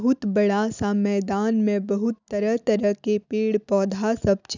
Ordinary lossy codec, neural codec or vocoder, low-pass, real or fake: none; none; 7.2 kHz; real